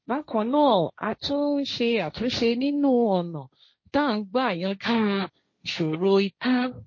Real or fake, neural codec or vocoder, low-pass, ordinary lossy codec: fake; codec, 16 kHz, 1.1 kbps, Voila-Tokenizer; 7.2 kHz; MP3, 32 kbps